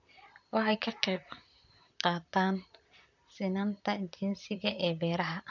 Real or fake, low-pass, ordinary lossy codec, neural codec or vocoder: fake; 7.2 kHz; none; vocoder, 44.1 kHz, 128 mel bands, Pupu-Vocoder